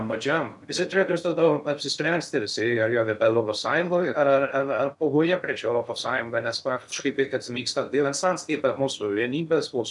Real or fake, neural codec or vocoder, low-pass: fake; codec, 16 kHz in and 24 kHz out, 0.6 kbps, FocalCodec, streaming, 2048 codes; 10.8 kHz